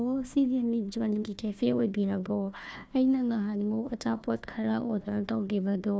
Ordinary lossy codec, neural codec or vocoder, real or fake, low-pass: none; codec, 16 kHz, 1 kbps, FunCodec, trained on Chinese and English, 50 frames a second; fake; none